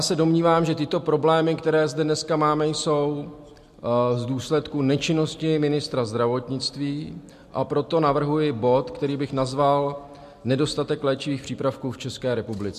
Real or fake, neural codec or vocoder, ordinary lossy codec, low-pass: real; none; MP3, 64 kbps; 14.4 kHz